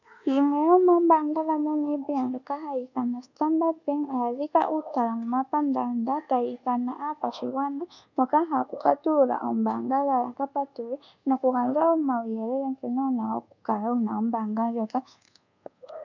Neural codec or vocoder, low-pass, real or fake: codec, 24 kHz, 1.2 kbps, DualCodec; 7.2 kHz; fake